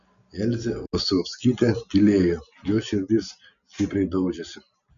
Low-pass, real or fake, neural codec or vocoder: 7.2 kHz; real; none